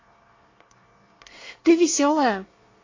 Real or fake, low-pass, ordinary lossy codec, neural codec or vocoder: fake; 7.2 kHz; AAC, 32 kbps; codec, 24 kHz, 1 kbps, SNAC